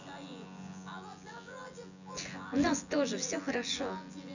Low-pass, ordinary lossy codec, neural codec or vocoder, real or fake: 7.2 kHz; none; vocoder, 24 kHz, 100 mel bands, Vocos; fake